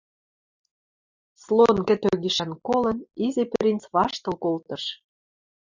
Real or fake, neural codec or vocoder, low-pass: real; none; 7.2 kHz